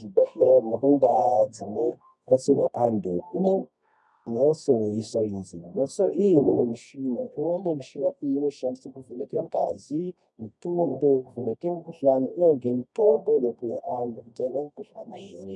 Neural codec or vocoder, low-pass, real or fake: codec, 24 kHz, 0.9 kbps, WavTokenizer, medium music audio release; 10.8 kHz; fake